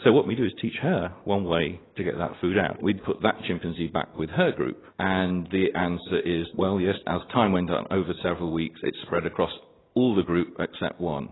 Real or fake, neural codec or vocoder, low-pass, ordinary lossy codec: real; none; 7.2 kHz; AAC, 16 kbps